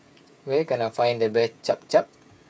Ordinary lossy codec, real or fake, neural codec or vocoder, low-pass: none; fake; codec, 16 kHz, 16 kbps, FreqCodec, smaller model; none